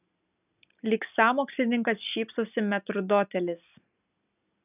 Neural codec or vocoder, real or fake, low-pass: none; real; 3.6 kHz